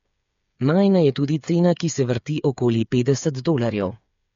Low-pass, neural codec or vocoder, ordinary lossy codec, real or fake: 7.2 kHz; codec, 16 kHz, 8 kbps, FreqCodec, smaller model; MP3, 48 kbps; fake